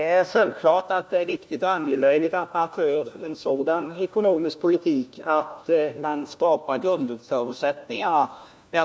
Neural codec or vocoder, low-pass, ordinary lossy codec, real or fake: codec, 16 kHz, 1 kbps, FunCodec, trained on LibriTTS, 50 frames a second; none; none; fake